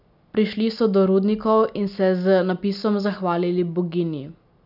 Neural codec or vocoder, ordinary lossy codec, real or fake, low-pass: none; none; real; 5.4 kHz